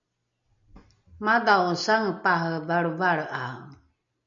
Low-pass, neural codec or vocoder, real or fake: 7.2 kHz; none; real